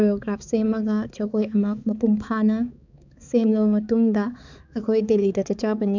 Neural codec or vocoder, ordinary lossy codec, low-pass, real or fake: codec, 16 kHz, 4 kbps, X-Codec, HuBERT features, trained on balanced general audio; none; 7.2 kHz; fake